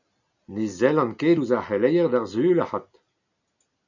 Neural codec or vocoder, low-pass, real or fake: none; 7.2 kHz; real